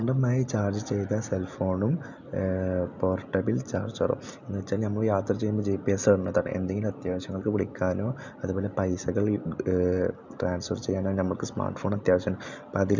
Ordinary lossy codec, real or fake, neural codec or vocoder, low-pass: none; real; none; 7.2 kHz